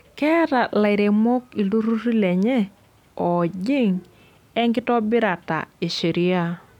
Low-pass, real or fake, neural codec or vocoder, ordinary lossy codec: 19.8 kHz; real; none; none